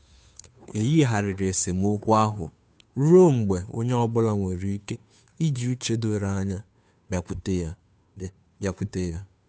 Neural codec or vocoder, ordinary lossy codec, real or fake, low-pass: codec, 16 kHz, 2 kbps, FunCodec, trained on Chinese and English, 25 frames a second; none; fake; none